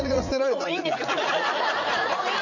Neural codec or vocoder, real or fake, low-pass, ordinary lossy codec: vocoder, 44.1 kHz, 80 mel bands, Vocos; fake; 7.2 kHz; none